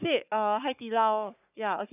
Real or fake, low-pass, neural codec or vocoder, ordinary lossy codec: fake; 3.6 kHz; codec, 44.1 kHz, 3.4 kbps, Pupu-Codec; none